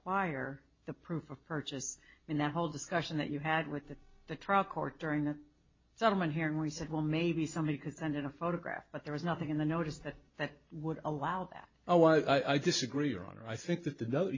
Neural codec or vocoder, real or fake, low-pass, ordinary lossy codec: none; real; 7.2 kHz; MP3, 32 kbps